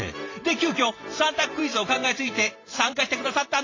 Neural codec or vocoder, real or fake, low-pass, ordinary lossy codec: vocoder, 44.1 kHz, 128 mel bands every 512 samples, BigVGAN v2; fake; 7.2 kHz; AAC, 32 kbps